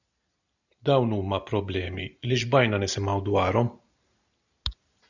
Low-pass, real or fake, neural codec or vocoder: 7.2 kHz; real; none